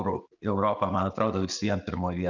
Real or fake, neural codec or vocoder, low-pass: real; none; 7.2 kHz